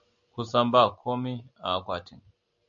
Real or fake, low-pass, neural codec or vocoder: real; 7.2 kHz; none